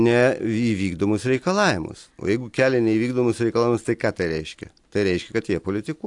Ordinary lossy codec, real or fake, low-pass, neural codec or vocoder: AAC, 64 kbps; real; 10.8 kHz; none